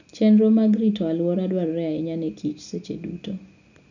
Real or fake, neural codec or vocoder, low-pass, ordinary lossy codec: real; none; 7.2 kHz; none